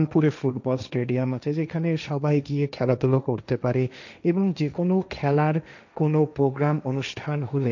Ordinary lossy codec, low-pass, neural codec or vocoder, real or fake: none; 7.2 kHz; codec, 16 kHz, 1.1 kbps, Voila-Tokenizer; fake